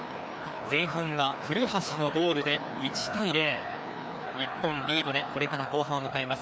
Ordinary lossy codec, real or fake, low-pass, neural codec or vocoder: none; fake; none; codec, 16 kHz, 2 kbps, FreqCodec, larger model